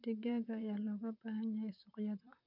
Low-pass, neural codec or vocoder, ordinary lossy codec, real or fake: 5.4 kHz; vocoder, 44.1 kHz, 128 mel bands, Pupu-Vocoder; none; fake